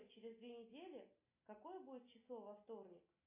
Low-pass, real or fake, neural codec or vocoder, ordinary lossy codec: 3.6 kHz; real; none; MP3, 24 kbps